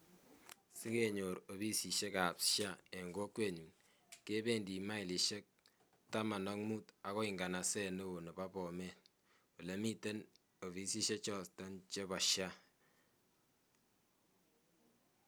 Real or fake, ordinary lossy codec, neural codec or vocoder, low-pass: real; none; none; none